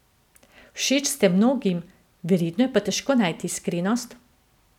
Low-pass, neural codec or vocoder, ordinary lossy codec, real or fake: 19.8 kHz; none; none; real